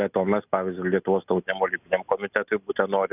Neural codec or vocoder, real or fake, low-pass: none; real; 3.6 kHz